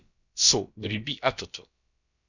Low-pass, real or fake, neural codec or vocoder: 7.2 kHz; fake; codec, 16 kHz, about 1 kbps, DyCAST, with the encoder's durations